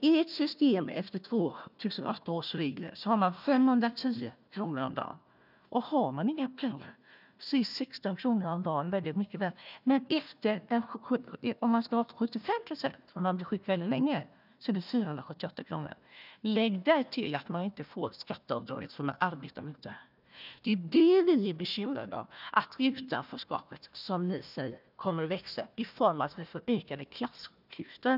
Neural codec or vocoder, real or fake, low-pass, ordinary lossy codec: codec, 16 kHz, 1 kbps, FunCodec, trained on Chinese and English, 50 frames a second; fake; 5.4 kHz; none